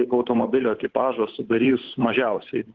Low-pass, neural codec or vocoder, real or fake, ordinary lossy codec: 7.2 kHz; codec, 16 kHz, 8 kbps, FunCodec, trained on Chinese and English, 25 frames a second; fake; Opus, 16 kbps